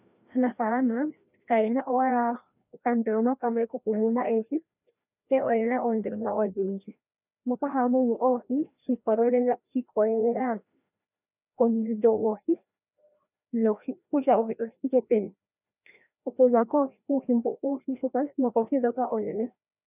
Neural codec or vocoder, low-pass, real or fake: codec, 16 kHz, 1 kbps, FreqCodec, larger model; 3.6 kHz; fake